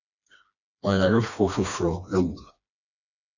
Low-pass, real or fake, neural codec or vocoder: 7.2 kHz; fake; codec, 16 kHz, 2 kbps, FreqCodec, smaller model